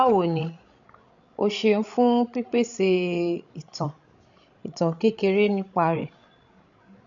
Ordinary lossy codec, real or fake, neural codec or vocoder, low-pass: none; fake; codec, 16 kHz, 16 kbps, FreqCodec, larger model; 7.2 kHz